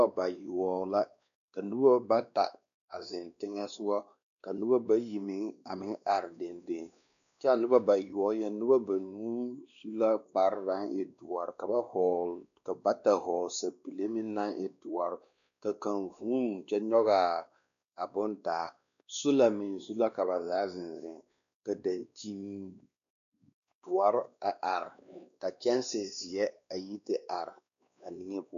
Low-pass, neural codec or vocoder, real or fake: 7.2 kHz; codec, 16 kHz, 2 kbps, X-Codec, WavLM features, trained on Multilingual LibriSpeech; fake